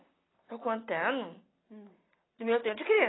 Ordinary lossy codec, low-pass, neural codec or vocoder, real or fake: AAC, 16 kbps; 7.2 kHz; none; real